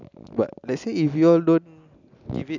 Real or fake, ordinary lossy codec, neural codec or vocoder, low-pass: real; none; none; 7.2 kHz